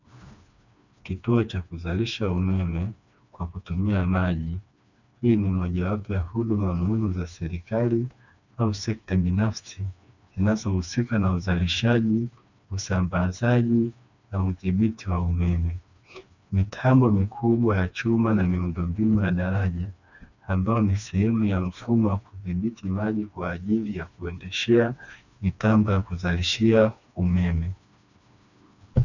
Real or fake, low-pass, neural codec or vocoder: fake; 7.2 kHz; codec, 16 kHz, 2 kbps, FreqCodec, smaller model